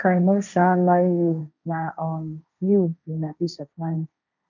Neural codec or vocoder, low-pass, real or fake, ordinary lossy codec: codec, 16 kHz, 1.1 kbps, Voila-Tokenizer; 7.2 kHz; fake; none